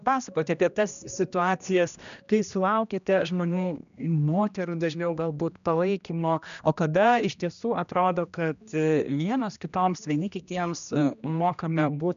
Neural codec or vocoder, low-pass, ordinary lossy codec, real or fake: codec, 16 kHz, 1 kbps, X-Codec, HuBERT features, trained on general audio; 7.2 kHz; MP3, 96 kbps; fake